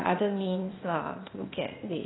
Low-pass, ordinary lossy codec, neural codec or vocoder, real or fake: 7.2 kHz; AAC, 16 kbps; codec, 16 kHz, 0.8 kbps, ZipCodec; fake